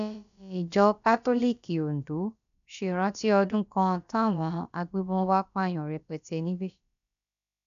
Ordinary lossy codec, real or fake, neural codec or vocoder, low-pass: none; fake; codec, 16 kHz, about 1 kbps, DyCAST, with the encoder's durations; 7.2 kHz